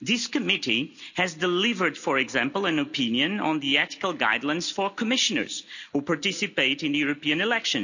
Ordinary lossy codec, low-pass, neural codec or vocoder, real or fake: none; 7.2 kHz; none; real